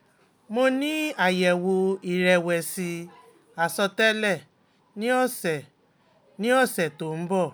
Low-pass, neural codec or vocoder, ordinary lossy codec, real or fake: none; none; none; real